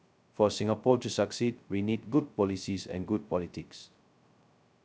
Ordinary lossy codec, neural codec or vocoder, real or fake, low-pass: none; codec, 16 kHz, 0.2 kbps, FocalCodec; fake; none